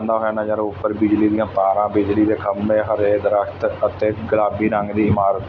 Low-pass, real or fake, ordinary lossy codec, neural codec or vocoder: 7.2 kHz; real; none; none